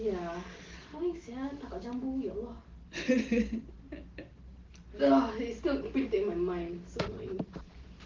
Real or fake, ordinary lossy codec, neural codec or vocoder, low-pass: real; Opus, 16 kbps; none; 7.2 kHz